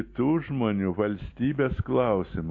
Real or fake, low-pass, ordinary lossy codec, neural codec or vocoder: real; 7.2 kHz; MP3, 48 kbps; none